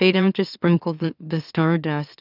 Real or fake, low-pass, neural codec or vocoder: fake; 5.4 kHz; autoencoder, 44.1 kHz, a latent of 192 numbers a frame, MeloTTS